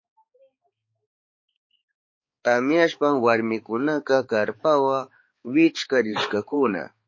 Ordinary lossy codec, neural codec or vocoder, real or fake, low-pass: MP3, 32 kbps; codec, 16 kHz, 4 kbps, X-Codec, HuBERT features, trained on balanced general audio; fake; 7.2 kHz